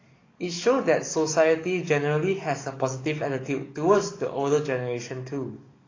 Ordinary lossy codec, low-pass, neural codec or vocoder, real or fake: AAC, 32 kbps; 7.2 kHz; codec, 44.1 kHz, 7.8 kbps, DAC; fake